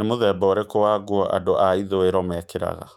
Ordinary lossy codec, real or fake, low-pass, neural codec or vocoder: none; fake; 19.8 kHz; autoencoder, 48 kHz, 128 numbers a frame, DAC-VAE, trained on Japanese speech